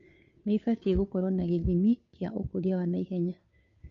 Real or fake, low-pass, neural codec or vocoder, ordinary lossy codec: fake; 7.2 kHz; codec, 16 kHz, 2 kbps, FunCodec, trained on Chinese and English, 25 frames a second; none